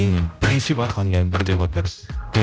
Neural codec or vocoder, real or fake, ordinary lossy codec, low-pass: codec, 16 kHz, 0.5 kbps, X-Codec, HuBERT features, trained on general audio; fake; none; none